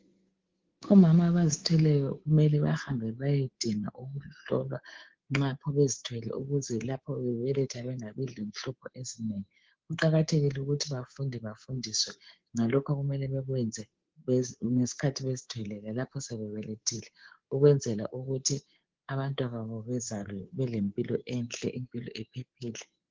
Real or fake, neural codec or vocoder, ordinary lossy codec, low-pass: fake; codec, 24 kHz, 3.1 kbps, DualCodec; Opus, 16 kbps; 7.2 kHz